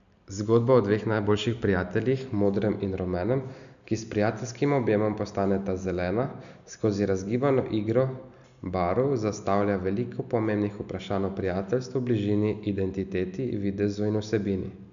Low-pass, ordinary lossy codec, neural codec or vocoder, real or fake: 7.2 kHz; none; none; real